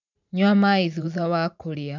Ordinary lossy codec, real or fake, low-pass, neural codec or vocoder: none; fake; 7.2 kHz; vocoder, 44.1 kHz, 128 mel bands every 512 samples, BigVGAN v2